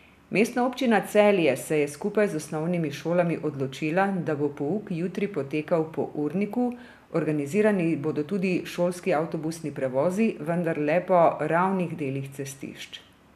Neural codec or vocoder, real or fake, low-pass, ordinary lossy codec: none; real; 14.4 kHz; none